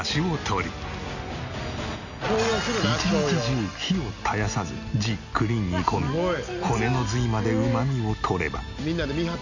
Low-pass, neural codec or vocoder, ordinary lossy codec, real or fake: 7.2 kHz; none; none; real